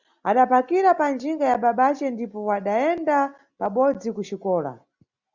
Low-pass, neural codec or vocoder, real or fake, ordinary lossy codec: 7.2 kHz; none; real; Opus, 64 kbps